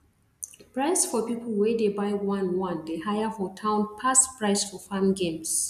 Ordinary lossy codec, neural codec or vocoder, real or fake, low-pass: none; none; real; 14.4 kHz